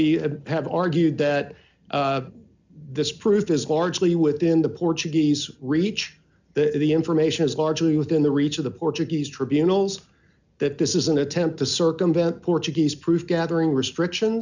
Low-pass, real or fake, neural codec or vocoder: 7.2 kHz; real; none